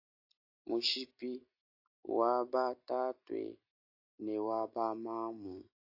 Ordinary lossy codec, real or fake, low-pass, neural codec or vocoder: AAC, 48 kbps; real; 5.4 kHz; none